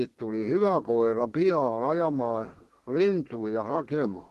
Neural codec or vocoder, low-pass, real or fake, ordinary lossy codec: codec, 32 kHz, 1.9 kbps, SNAC; 14.4 kHz; fake; Opus, 16 kbps